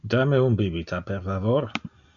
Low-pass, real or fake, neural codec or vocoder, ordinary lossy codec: 7.2 kHz; real; none; AAC, 64 kbps